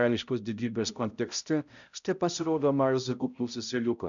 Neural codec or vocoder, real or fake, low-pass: codec, 16 kHz, 0.5 kbps, X-Codec, HuBERT features, trained on balanced general audio; fake; 7.2 kHz